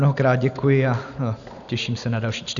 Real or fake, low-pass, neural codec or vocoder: real; 7.2 kHz; none